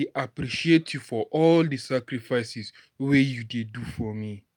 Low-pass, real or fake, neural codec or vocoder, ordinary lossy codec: 19.8 kHz; real; none; none